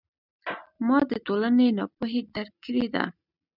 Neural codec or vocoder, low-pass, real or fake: none; 5.4 kHz; real